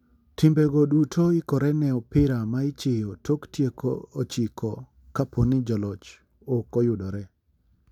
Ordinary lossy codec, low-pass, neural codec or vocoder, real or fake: none; 19.8 kHz; none; real